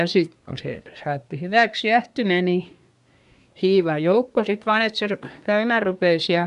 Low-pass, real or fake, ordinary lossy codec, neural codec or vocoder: 10.8 kHz; fake; none; codec, 24 kHz, 1 kbps, SNAC